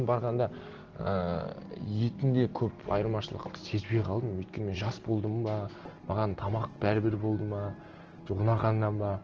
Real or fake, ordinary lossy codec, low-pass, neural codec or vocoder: real; Opus, 16 kbps; 7.2 kHz; none